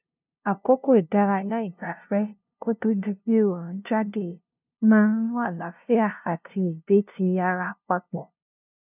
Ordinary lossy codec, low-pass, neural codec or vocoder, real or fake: none; 3.6 kHz; codec, 16 kHz, 0.5 kbps, FunCodec, trained on LibriTTS, 25 frames a second; fake